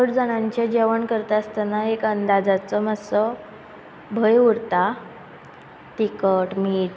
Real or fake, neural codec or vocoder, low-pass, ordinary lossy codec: real; none; none; none